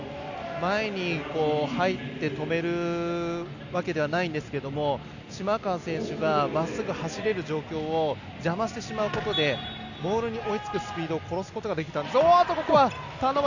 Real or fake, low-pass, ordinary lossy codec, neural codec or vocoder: real; 7.2 kHz; none; none